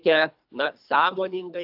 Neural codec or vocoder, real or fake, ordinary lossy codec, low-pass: codec, 24 kHz, 3 kbps, HILCodec; fake; AAC, 48 kbps; 5.4 kHz